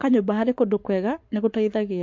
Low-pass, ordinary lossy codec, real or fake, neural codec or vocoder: 7.2 kHz; MP3, 48 kbps; fake; codec, 44.1 kHz, 7.8 kbps, Pupu-Codec